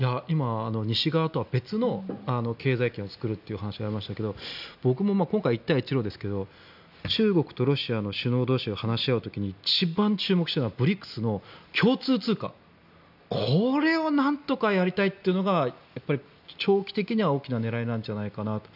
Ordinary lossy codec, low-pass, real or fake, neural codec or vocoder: none; 5.4 kHz; real; none